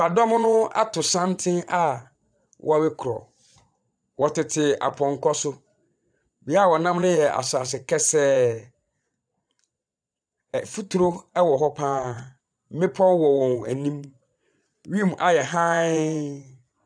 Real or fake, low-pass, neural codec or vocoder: fake; 9.9 kHz; vocoder, 22.05 kHz, 80 mel bands, Vocos